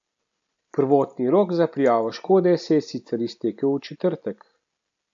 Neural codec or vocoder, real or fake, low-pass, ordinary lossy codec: none; real; 7.2 kHz; AAC, 64 kbps